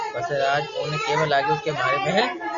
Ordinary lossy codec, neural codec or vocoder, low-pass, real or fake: Opus, 64 kbps; none; 7.2 kHz; real